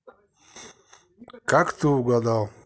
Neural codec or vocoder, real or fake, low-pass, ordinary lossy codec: none; real; none; none